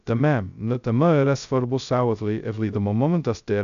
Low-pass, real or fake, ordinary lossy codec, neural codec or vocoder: 7.2 kHz; fake; MP3, 96 kbps; codec, 16 kHz, 0.2 kbps, FocalCodec